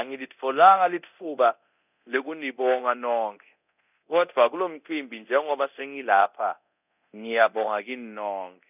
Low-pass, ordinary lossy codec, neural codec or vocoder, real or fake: 3.6 kHz; none; codec, 24 kHz, 0.9 kbps, DualCodec; fake